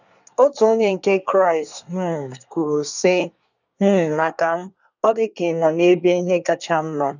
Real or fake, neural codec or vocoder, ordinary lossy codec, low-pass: fake; codec, 24 kHz, 1 kbps, SNAC; none; 7.2 kHz